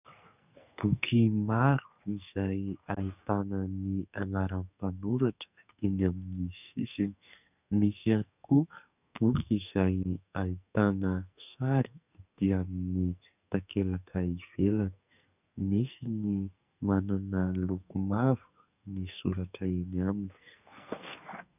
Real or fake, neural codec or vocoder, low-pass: fake; codec, 44.1 kHz, 2.6 kbps, SNAC; 3.6 kHz